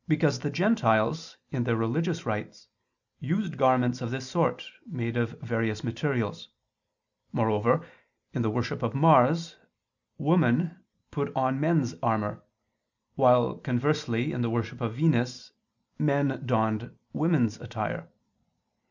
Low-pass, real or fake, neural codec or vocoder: 7.2 kHz; real; none